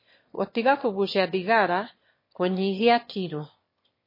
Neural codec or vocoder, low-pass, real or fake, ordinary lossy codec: autoencoder, 22.05 kHz, a latent of 192 numbers a frame, VITS, trained on one speaker; 5.4 kHz; fake; MP3, 24 kbps